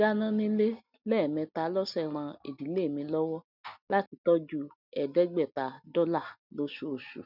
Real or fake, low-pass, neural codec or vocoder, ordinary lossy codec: real; 5.4 kHz; none; none